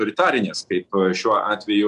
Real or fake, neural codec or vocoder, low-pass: real; none; 10.8 kHz